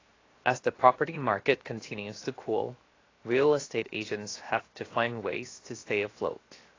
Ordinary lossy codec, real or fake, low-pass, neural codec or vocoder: AAC, 32 kbps; fake; 7.2 kHz; codec, 16 kHz, 0.7 kbps, FocalCodec